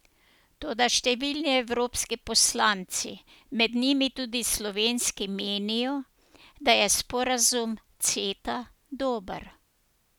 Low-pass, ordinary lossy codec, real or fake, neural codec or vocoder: none; none; real; none